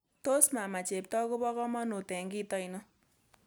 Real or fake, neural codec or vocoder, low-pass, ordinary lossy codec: real; none; none; none